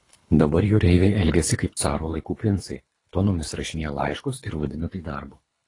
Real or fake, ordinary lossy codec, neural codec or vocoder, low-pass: fake; AAC, 32 kbps; codec, 24 kHz, 3 kbps, HILCodec; 10.8 kHz